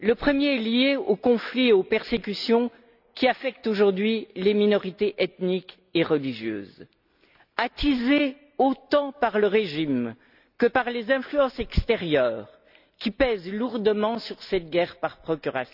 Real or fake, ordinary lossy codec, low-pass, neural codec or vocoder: real; none; 5.4 kHz; none